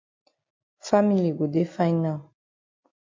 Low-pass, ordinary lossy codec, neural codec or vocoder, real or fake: 7.2 kHz; MP3, 48 kbps; none; real